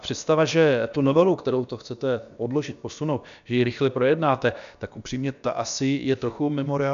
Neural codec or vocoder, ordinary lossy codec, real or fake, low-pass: codec, 16 kHz, about 1 kbps, DyCAST, with the encoder's durations; MP3, 96 kbps; fake; 7.2 kHz